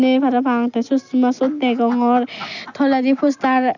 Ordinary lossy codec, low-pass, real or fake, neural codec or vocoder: none; 7.2 kHz; real; none